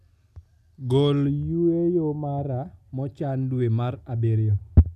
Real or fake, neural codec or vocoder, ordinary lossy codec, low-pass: real; none; none; 14.4 kHz